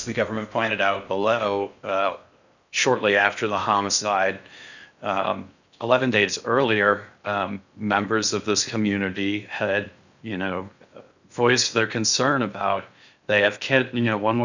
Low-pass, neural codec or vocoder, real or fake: 7.2 kHz; codec, 16 kHz in and 24 kHz out, 0.6 kbps, FocalCodec, streaming, 4096 codes; fake